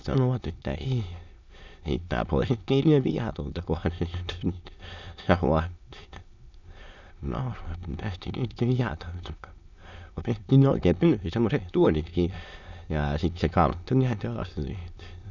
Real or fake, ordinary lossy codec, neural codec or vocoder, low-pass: fake; none; autoencoder, 22.05 kHz, a latent of 192 numbers a frame, VITS, trained on many speakers; 7.2 kHz